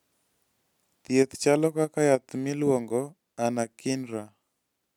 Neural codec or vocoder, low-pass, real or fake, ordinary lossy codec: none; 19.8 kHz; real; none